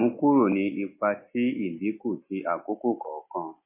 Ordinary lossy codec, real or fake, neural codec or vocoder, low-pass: MP3, 24 kbps; real; none; 3.6 kHz